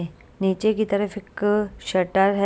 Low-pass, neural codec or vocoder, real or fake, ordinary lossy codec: none; none; real; none